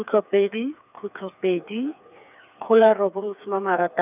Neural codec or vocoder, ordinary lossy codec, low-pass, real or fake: codec, 16 kHz, 4 kbps, FreqCodec, smaller model; none; 3.6 kHz; fake